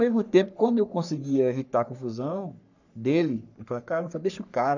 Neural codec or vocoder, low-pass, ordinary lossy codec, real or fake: codec, 44.1 kHz, 3.4 kbps, Pupu-Codec; 7.2 kHz; none; fake